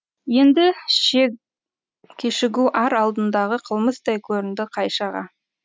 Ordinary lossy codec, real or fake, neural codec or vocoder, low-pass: none; real; none; none